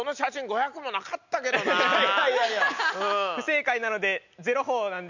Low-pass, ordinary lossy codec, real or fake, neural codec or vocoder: 7.2 kHz; none; real; none